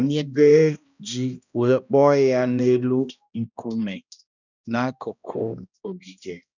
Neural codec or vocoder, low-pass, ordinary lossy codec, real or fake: codec, 16 kHz, 1 kbps, X-Codec, HuBERT features, trained on balanced general audio; 7.2 kHz; none; fake